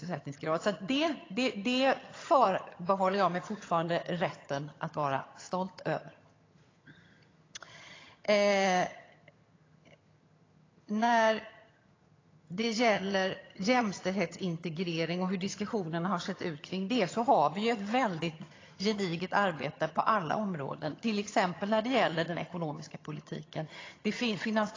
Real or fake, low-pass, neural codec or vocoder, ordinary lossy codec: fake; 7.2 kHz; vocoder, 22.05 kHz, 80 mel bands, HiFi-GAN; AAC, 32 kbps